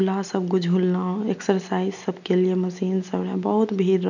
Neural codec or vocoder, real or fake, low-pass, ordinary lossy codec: none; real; 7.2 kHz; none